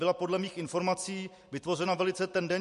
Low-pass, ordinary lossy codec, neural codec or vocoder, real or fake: 14.4 kHz; MP3, 48 kbps; none; real